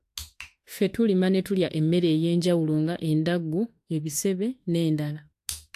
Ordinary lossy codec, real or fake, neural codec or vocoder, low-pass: AAC, 64 kbps; fake; autoencoder, 48 kHz, 32 numbers a frame, DAC-VAE, trained on Japanese speech; 14.4 kHz